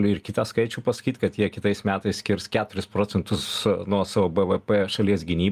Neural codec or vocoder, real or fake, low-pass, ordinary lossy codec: none; real; 14.4 kHz; Opus, 32 kbps